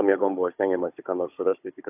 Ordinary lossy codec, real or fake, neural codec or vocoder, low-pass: AAC, 32 kbps; fake; codec, 16 kHz, 16 kbps, FunCodec, trained on Chinese and English, 50 frames a second; 3.6 kHz